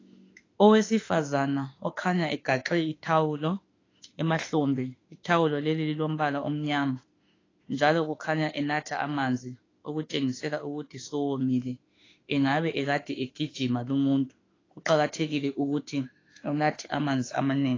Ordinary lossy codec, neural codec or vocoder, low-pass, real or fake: AAC, 32 kbps; autoencoder, 48 kHz, 32 numbers a frame, DAC-VAE, trained on Japanese speech; 7.2 kHz; fake